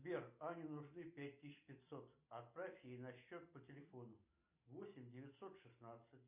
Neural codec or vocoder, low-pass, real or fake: none; 3.6 kHz; real